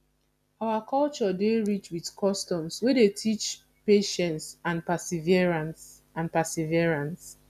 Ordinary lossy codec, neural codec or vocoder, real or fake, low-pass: none; none; real; 14.4 kHz